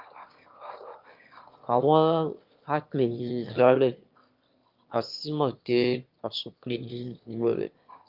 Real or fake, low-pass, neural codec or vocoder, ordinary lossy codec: fake; 5.4 kHz; autoencoder, 22.05 kHz, a latent of 192 numbers a frame, VITS, trained on one speaker; Opus, 24 kbps